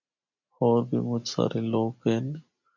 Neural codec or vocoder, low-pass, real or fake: none; 7.2 kHz; real